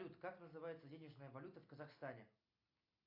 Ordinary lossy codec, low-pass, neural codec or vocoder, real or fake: AAC, 32 kbps; 5.4 kHz; none; real